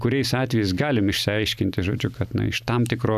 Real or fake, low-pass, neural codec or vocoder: real; 14.4 kHz; none